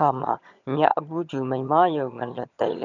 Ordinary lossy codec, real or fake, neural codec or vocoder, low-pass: none; fake; vocoder, 22.05 kHz, 80 mel bands, HiFi-GAN; 7.2 kHz